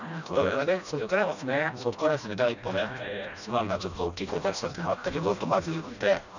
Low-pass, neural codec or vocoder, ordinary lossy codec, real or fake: 7.2 kHz; codec, 16 kHz, 1 kbps, FreqCodec, smaller model; none; fake